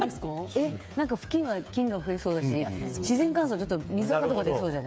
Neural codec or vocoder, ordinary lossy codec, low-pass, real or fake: codec, 16 kHz, 8 kbps, FreqCodec, smaller model; none; none; fake